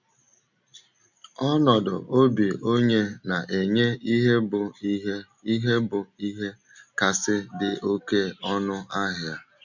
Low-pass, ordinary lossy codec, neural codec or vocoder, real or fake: 7.2 kHz; none; none; real